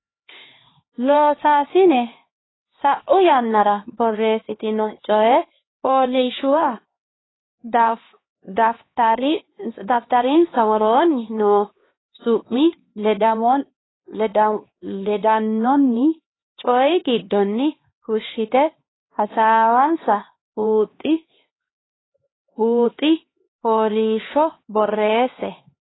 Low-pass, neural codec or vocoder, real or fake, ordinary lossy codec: 7.2 kHz; codec, 16 kHz, 2 kbps, X-Codec, HuBERT features, trained on LibriSpeech; fake; AAC, 16 kbps